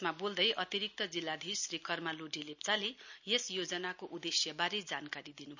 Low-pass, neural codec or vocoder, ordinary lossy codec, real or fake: 7.2 kHz; none; none; real